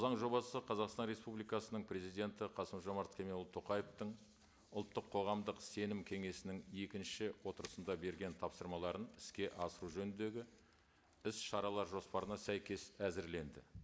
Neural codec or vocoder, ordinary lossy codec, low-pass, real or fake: none; none; none; real